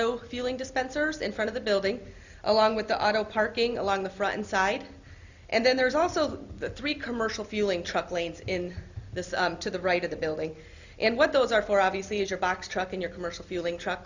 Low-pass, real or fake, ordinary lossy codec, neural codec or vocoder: 7.2 kHz; real; Opus, 64 kbps; none